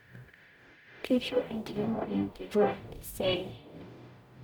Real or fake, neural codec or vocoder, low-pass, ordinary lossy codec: fake; codec, 44.1 kHz, 0.9 kbps, DAC; none; none